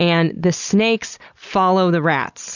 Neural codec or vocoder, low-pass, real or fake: none; 7.2 kHz; real